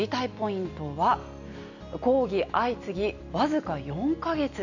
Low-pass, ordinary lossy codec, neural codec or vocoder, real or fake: 7.2 kHz; none; none; real